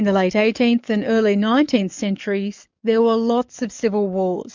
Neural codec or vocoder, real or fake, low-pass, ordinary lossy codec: codec, 44.1 kHz, 7.8 kbps, DAC; fake; 7.2 kHz; MP3, 64 kbps